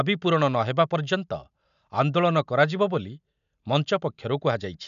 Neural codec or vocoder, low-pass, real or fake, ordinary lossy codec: none; 7.2 kHz; real; none